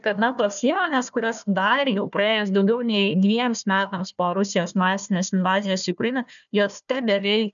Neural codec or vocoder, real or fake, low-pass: codec, 16 kHz, 1 kbps, FunCodec, trained on Chinese and English, 50 frames a second; fake; 7.2 kHz